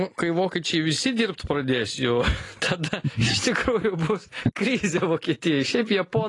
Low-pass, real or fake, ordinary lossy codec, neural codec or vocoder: 10.8 kHz; real; AAC, 32 kbps; none